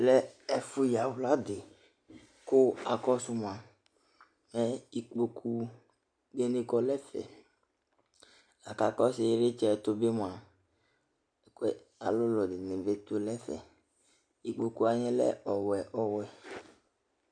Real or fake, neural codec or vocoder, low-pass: real; none; 9.9 kHz